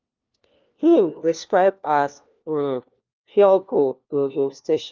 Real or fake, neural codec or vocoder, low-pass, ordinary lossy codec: fake; codec, 16 kHz, 1 kbps, FunCodec, trained on LibriTTS, 50 frames a second; 7.2 kHz; Opus, 24 kbps